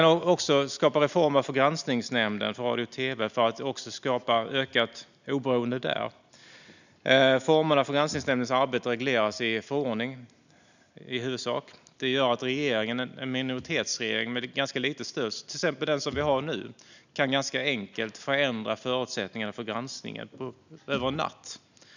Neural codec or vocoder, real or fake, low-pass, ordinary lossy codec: none; real; 7.2 kHz; none